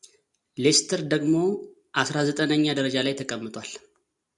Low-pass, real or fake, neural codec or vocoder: 10.8 kHz; real; none